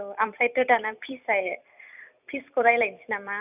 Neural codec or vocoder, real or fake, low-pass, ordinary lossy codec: vocoder, 44.1 kHz, 128 mel bands every 256 samples, BigVGAN v2; fake; 3.6 kHz; none